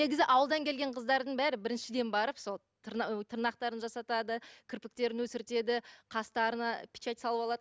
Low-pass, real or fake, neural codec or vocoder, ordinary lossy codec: none; real; none; none